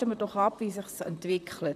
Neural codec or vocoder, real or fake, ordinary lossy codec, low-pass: vocoder, 44.1 kHz, 128 mel bands, Pupu-Vocoder; fake; none; 14.4 kHz